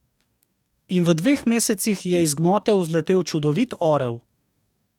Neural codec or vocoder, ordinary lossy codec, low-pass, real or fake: codec, 44.1 kHz, 2.6 kbps, DAC; none; 19.8 kHz; fake